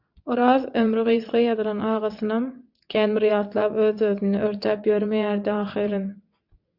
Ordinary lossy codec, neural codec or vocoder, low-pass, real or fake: AAC, 48 kbps; vocoder, 22.05 kHz, 80 mel bands, WaveNeXt; 5.4 kHz; fake